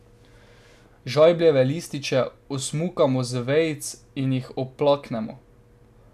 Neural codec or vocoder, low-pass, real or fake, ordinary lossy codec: none; 14.4 kHz; real; none